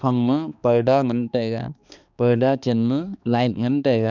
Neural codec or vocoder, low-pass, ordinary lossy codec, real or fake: codec, 16 kHz, 2 kbps, X-Codec, HuBERT features, trained on balanced general audio; 7.2 kHz; none; fake